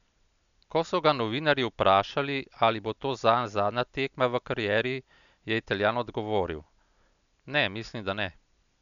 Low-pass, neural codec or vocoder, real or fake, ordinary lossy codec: 7.2 kHz; none; real; none